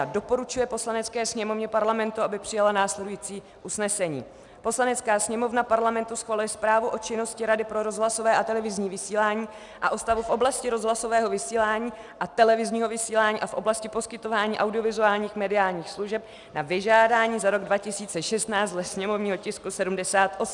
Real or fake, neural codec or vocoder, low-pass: real; none; 10.8 kHz